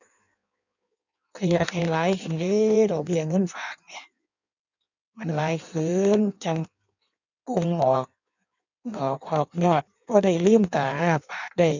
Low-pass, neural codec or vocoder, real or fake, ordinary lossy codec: 7.2 kHz; codec, 16 kHz in and 24 kHz out, 1.1 kbps, FireRedTTS-2 codec; fake; none